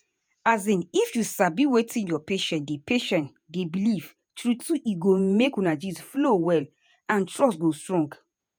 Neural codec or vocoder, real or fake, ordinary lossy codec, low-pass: vocoder, 48 kHz, 128 mel bands, Vocos; fake; none; none